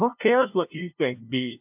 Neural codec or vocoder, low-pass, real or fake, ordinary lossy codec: codec, 16 kHz, 0.5 kbps, FunCodec, trained on LibriTTS, 25 frames a second; 3.6 kHz; fake; AAC, 32 kbps